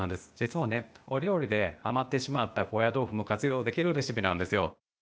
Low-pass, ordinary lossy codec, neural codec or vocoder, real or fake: none; none; codec, 16 kHz, 0.8 kbps, ZipCodec; fake